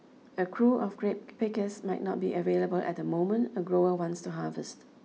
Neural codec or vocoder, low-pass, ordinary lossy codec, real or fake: none; none; none; real